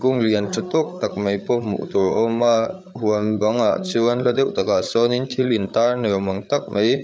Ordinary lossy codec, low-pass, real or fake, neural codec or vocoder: none; none; fake; codec, 16 kHz, 8 kbps, FreqCodec, larger model